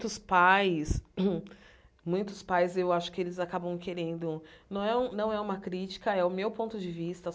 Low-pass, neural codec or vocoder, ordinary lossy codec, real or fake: none; none; none; real